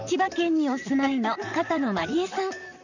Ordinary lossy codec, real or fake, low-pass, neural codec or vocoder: none; fake; 7.2 kHz; vocoder, 44.1 kHz, 128 mel bands, Pupu-Vocoder